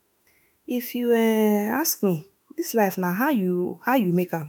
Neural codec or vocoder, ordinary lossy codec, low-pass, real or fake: autoencoder, 48 kHz, 32 numbers a frame, DAC-VAE, trained on Japanese speech; none; none; fake